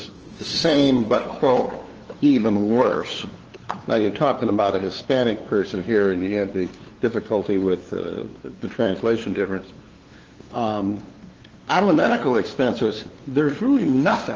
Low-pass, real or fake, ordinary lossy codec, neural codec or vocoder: 7.2 kHz; fake; Opus, 16 kbps; codec, 16 kHz, 2 kbps, FunCodec, trained on LibriTTS, 25 frames a second